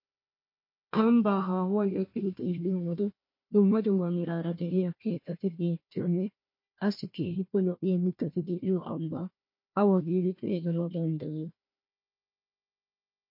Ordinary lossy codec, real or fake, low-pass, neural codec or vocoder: MP3, 32 kbps; fake; 5.4 kHz; codec, 16 kHz, 1 kbps, FunCodec, trained on Chinese and English, 50 frames a second